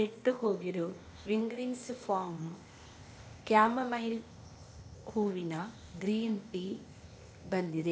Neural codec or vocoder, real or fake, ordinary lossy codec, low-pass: codec, 16 kHz, 0.8 kbps, ZipCodec; fake; none; none